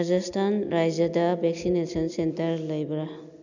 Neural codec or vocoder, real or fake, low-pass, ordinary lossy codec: none; real; 7.2 kHz; none